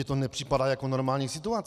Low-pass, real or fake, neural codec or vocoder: 14.4 kHz; real; none